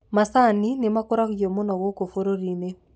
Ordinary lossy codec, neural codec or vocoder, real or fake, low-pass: none; none; real; none